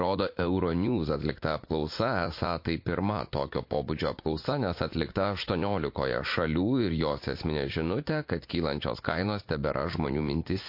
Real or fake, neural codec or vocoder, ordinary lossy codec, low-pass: fake; vocoder, 44.1 kHz, 80 mel bands, Vocos; MP3, 32 kbps; 5.4 kHz